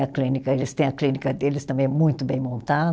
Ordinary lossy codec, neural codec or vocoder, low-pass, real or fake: none; none; none; real